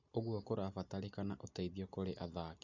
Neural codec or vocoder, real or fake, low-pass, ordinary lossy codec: none; real; 7.2 kHz; none